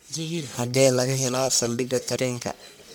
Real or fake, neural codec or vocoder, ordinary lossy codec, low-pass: fake; codec, 44.1 kHz, 1.7 kbps, Pupu-Codec; none; none